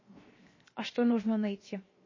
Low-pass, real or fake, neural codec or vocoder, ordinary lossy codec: 7.2 kHz; fake; codec, 16 kHz, 0.7 kbps, FocalCodec; MP3, 32 kbps